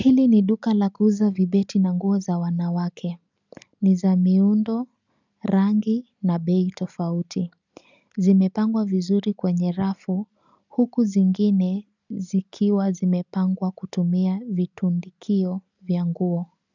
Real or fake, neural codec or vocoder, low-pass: real; none; 7.2 kHz